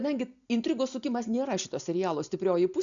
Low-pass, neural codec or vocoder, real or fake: 7.2 kHz; none; real